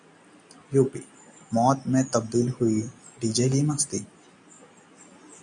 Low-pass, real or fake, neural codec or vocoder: 9.9 kHz; real; none